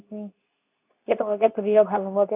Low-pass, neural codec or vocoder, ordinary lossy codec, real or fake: 3.6 kHz; codec, 24 kHz, 0.9 kbps, WavTokenizer, medium speech release version 2; none; fake